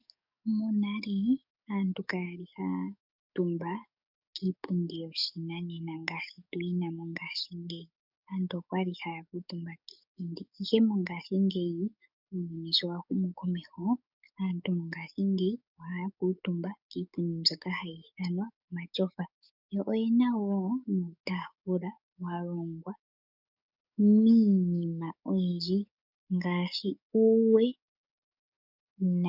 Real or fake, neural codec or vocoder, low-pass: fake; codec, 44.1 kHz, 7.8 kbps, DAC; 5.4 kHz